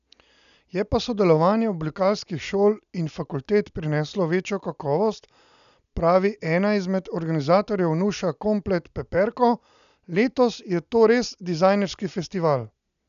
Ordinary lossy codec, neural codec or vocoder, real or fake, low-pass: none; none; real; 7.2 kHz